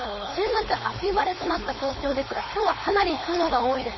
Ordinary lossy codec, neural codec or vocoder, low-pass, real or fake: MP3, 24 kbps; codec, 16 kHz, 4.8 kbps, FACodec; 7.2 kHz; fake